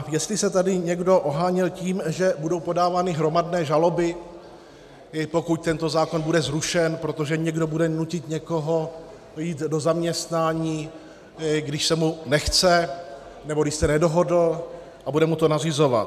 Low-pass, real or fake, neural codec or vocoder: 14.4 kHz; real; none